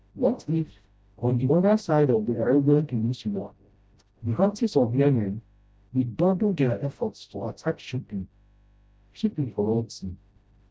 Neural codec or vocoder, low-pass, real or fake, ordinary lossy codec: codec, 16 kHz, 0.5 kbps, FreqCodec, smaller model; none; fake; none